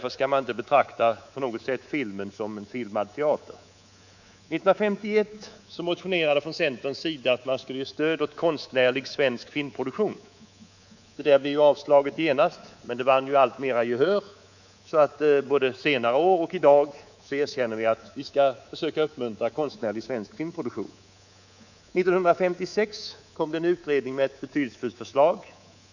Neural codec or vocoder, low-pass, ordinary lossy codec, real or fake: codec, 24 kHz, 3.1 kbps, DualCodec; 7.2 kHz; none; fake